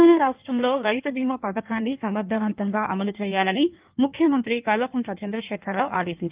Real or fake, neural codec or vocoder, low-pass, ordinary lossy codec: fake; codec, 16 kHz in and 24 kHz out, 1.1 kbps, FireRedTTS-2 codec; 3.6 kHz; Opus, 24 kbps